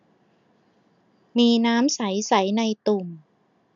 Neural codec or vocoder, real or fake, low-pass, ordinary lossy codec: none; real; 7.2 kHz; none